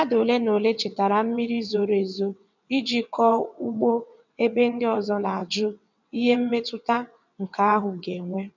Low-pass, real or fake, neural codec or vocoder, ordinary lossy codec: 7.2 kHz; fake; vocoder, 22.05 kHz, 80 mel bands, WaveNeXt; none